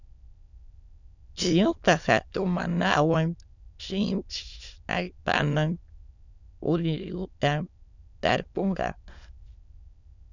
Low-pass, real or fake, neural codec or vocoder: 7.2 kHz; fake; autoencoder, 22.05 kHz, a latent of 192 numbers a frame, VITS, trained on many speakers